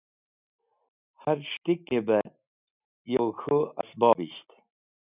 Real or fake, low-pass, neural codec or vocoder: real; 3.6 kHz; none